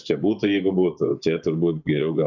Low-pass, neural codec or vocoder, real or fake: 7.2 kHz; vocoder, 24 kHz, 100 mel bands, Vocos; fake